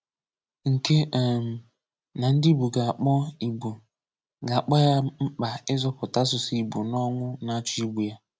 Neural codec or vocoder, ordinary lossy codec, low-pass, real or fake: none; none; none; real